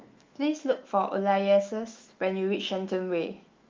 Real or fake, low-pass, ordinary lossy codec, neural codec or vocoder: fake; 7.2 kHz; Opus, 32 kbps; autoencoder, 48 kHz, 128 numbers a frame, DAC-VAE, trained on Japanese speech